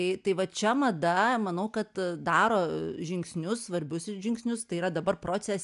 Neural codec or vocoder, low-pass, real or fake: none; 10.8 kHz; real